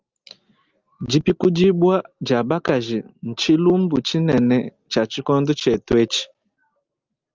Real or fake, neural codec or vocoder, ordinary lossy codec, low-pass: real; none; Opus, 24 kbps; 7.2 kHz